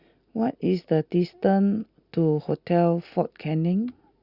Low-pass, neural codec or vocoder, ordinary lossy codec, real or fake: 5.4 kHz; none; Opus, 64 kbps; real